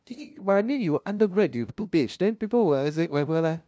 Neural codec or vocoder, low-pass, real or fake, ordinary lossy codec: codec, 16 kHz, 0.5 kbps, FunCodec, trained on LibriTTS, 25 frames a second; none; fake; none